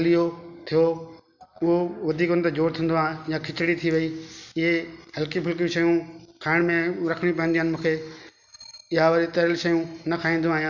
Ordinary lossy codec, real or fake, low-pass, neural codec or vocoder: none; real; 7.2 kHz; none